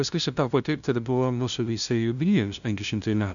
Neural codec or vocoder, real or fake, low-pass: codec, 16 kHz, 0.5 kbps, FunCodec, trained on LibriTTS, 25 frames a second; fake; 7.2 kHz